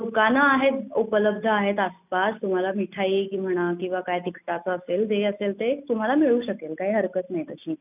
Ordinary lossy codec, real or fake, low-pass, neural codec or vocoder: none; real; 3.6 kHz; none